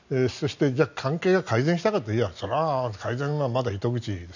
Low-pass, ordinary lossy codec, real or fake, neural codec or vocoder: 7.2 kHz; none; real; none